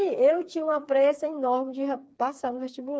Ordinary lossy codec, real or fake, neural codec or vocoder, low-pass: none; fake; codec, 16 kHz, 4 kbps, FreqCodec, smaller model; none